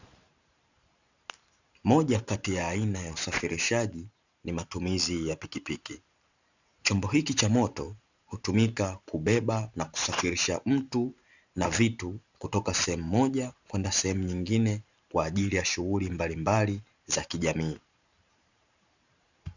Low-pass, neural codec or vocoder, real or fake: 7.2 kHz; vocoder, 44.1 kHz, 128 mel bands every 512 samples, BigVGAN v2; fake